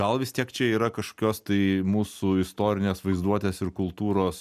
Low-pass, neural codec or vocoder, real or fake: 14.4 kHz; none; real